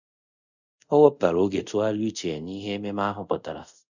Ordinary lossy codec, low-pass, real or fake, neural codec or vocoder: none; 7.2 kHz; fake; codec, 24 kHz, 0.5 kbps, DualCodec